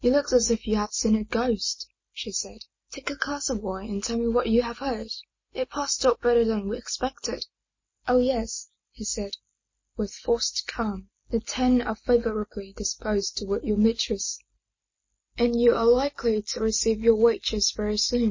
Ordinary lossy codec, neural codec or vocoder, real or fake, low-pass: MP3, 32 kbps; none; real; 7.2 kHz